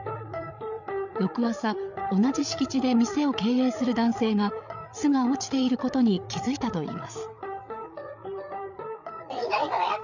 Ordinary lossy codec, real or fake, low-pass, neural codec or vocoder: none; fake; 7.2 kHz; codec, 16 kHz, 8 kbps, FreqCodec, larger model